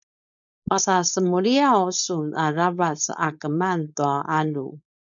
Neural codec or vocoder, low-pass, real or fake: codec, 16 kHz, 4.8 kbps, FACodec; 7.2 kHz; fake